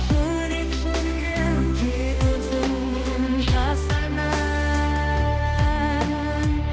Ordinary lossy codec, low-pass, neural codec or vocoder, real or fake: none; none; codec, 16 kHz, 1 kbps, X-Codec, HuBERT features, trained on balanced general audio; fake